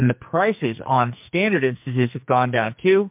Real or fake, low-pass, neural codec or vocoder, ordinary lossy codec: fake; 3.6 kHz; codec, 44.1 kHz, 2.6 kbps, SNAC; MP3, 32 kbps